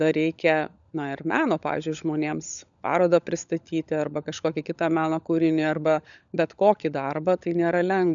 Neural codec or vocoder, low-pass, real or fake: codec, 16 kHz, 16 kbps, FunCodec, trained on LibriTTS, 50 frames a second; 7.2 kHz; fake